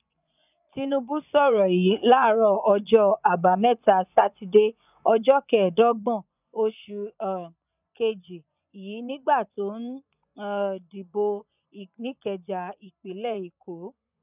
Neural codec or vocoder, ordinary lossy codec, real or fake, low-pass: vocoder, 24 kHz, 100 mel bands, Vocos; none; fake; 3.6 kHz